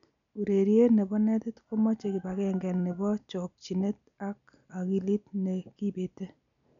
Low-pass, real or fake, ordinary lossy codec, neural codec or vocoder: 7.2 kHz; real; none; none